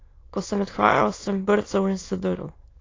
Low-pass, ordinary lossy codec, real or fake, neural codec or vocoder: 7.2 kHz; AAC, 32 kbps; fake; autoencoder, 22.05 kHz, a latent of 192 numbers a frame, VITS, trained on many speakers